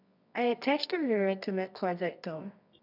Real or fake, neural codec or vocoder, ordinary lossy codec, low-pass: fake; codec, 24 kHz, 0.9 kbps, WavTokenizer, medium music audio release; none; 5.4 kHz